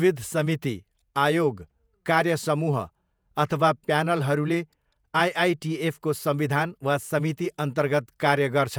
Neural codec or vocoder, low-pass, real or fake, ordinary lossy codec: vocoder, 48 kHz, 128 mel bands, Vocos; none; fake; none